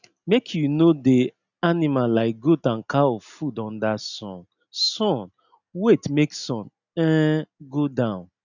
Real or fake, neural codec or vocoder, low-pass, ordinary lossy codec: real; none; 7.2 kHz; none